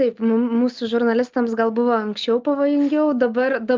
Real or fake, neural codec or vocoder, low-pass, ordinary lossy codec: real; none; 7.2 kHz; Opus, 24 kbps